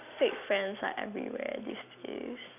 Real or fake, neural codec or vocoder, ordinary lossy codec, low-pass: real; none; none; 3.6 kHz